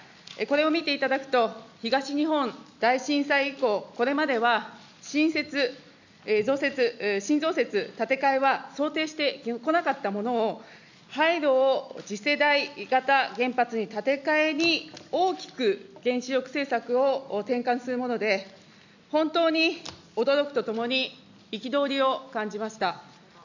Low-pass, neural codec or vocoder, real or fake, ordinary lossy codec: 7.2 kHz; none; real; none